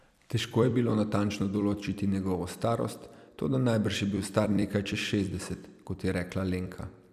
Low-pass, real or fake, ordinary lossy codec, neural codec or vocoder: 14.4 kHz; fake; none; vocoder, 44.1 kHz, 128 mel bands every 256 samples, BigVGAN v2